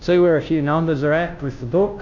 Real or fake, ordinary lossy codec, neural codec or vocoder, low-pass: fake; MP3, 64 kbps; codec, 16 kHz, 0.5 kbps, FunCodec, trained on Chinese and English, 25 frames a second; 7.2 kHz